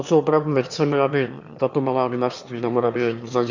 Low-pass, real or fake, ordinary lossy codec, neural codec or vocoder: 7.2 kHz; fake; Opus, 64 kbps; autoencoder, 22.05 kHz, a latent of 192 numbers a frame, VITS, trained on one speaker